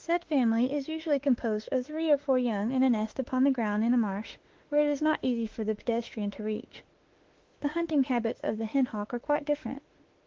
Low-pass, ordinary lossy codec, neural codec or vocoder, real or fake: 7.2 kHz; Opus, 16 kbps; autoencoder, 48 kHz, 32 numbers a frame, DAC-VAE, trained on Japanese speech; fake